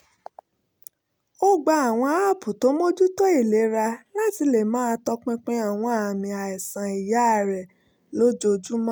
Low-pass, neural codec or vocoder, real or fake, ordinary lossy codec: none; none; real; none